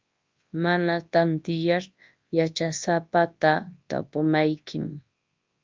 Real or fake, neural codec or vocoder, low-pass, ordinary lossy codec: fake; codec, 24 kHz, 0.9 kbps, WavTokenizer, large speech release; 7.2 kHz; Opus, 24 kbps